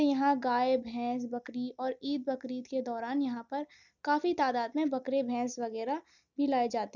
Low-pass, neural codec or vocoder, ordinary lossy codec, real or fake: 7.2 kHz; none; none; real